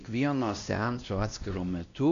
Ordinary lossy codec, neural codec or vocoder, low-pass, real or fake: MP3, 96 kbps; codec, 16 kHz, 1 kbps, X-Codec, WavLM features, trained on Multilingual LibriSpeech; 7.2 kHz; fake